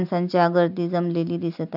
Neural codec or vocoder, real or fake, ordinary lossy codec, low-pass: none; real; AAC, 48 kbps; 5.4 kHz